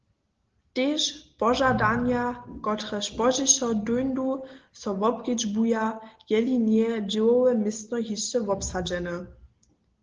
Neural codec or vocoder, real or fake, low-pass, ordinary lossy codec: none; real; 7.2 kHz; Opus, 16 kbps